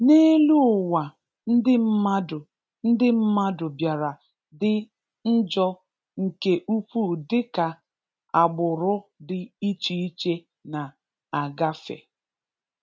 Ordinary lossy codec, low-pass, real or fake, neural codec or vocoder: none; none; real; none